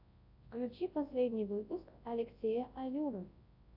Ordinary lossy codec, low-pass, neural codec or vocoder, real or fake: AAC, 48 kbps; 5.4 kHz; codec, 24 kHz, 0.9 kbps, WavTokenizer, large speech release; fake